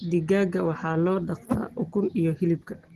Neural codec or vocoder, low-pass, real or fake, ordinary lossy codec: none; 14.4 kHz; real; Opus, 16 kbps